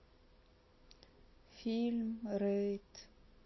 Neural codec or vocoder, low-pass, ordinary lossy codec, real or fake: none; 7.2 kHz; MP3, 24 kbps; real